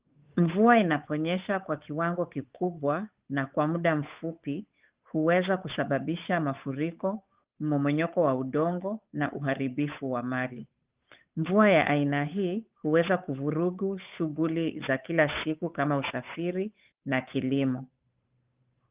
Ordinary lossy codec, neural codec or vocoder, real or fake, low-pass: Opus, 32 kbps; codec, 16 kHz, 8 kbps, FunCodec, trained on Chinese and English, 25 frames a second; fake; 3.6 kHz